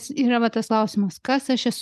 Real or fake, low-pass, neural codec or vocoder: real; 14.4 kHz; none